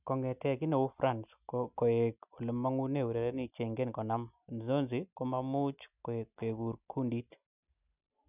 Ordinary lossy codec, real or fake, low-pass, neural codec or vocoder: none; real; 3.6 kHz; none